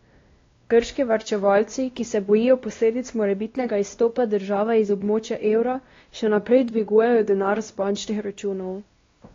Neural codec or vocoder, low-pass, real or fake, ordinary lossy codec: codec, 16 kHz, 1 kbps, X-Codec, WavLM features, trained on Multilingual LibriSpeech; 7.2 kHz; fake; AAC, 32 kbps